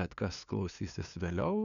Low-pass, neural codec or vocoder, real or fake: 7.2 kHz; codec, 16 kHz, 2 kbps, FunCodec, trained on LibriTTS, 25 frames a second; fake